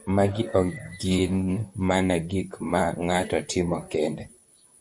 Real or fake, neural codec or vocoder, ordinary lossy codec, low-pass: fake; vocoder, 44.1 kHz, 128 mel bands, Pupu-Vocoder; MP3, 96 kbps; 10.8 kHz